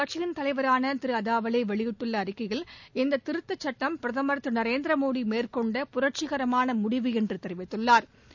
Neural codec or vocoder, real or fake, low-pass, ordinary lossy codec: none; real; 7.2 kHz; none